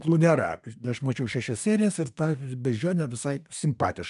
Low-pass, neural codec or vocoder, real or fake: 10.8 kHz; codec, 24 kHz, 1 kbps, SNAC; fake